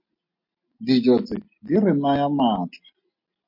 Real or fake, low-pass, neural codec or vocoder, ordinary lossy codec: real; 5.4 kHz; none; MP3, 32 kbps